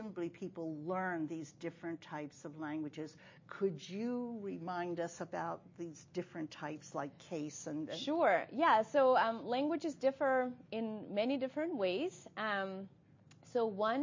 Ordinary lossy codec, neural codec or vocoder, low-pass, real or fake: MP3, 32 kbps; none; 7.2 kHz; real